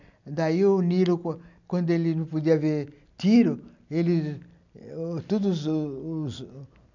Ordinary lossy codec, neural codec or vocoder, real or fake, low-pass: none; none; real; 7.2 kHz